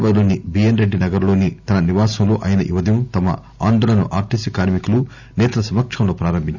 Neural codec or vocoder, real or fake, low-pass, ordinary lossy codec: none; real; 7.2 kHz; none